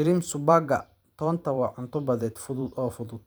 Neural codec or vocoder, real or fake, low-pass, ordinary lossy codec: vocoder, 44.1 kHz, 128 mel bands every 512 samples, BigVGAN v2; fake; none; none